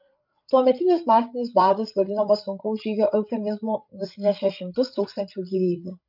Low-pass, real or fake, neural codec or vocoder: 5.4 kHz; fake; codec, 16 kHz, 8 kbps, FreqCodec, larger model